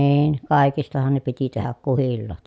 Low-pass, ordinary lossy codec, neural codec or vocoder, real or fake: none; none; none; real